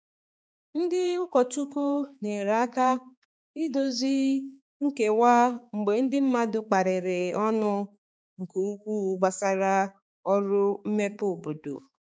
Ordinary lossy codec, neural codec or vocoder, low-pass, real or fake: none; codec, 16 kHz, 4 kbps, X-Codec, HuBERT features, trained on balanced general audio; none; fake